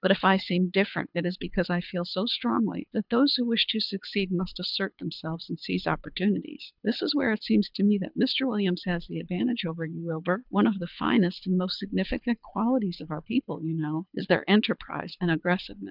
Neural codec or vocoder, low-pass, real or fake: codec, 24 kHz, 6 kbps, HILCodec; 5.4 kHz; fake